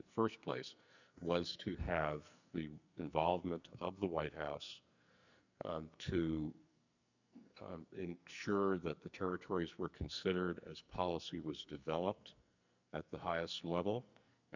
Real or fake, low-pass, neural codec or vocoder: fake; 7.2 kHz; codec, 44.1 kHz, 2.6 kbps, SNAC